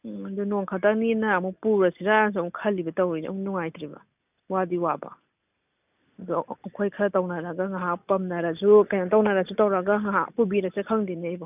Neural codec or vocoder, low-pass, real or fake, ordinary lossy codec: none; 3.6 kHz; real; none